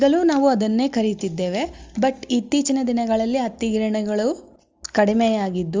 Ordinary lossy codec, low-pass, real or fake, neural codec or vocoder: Opus, 32 kbps; 7.2 kHz; real; none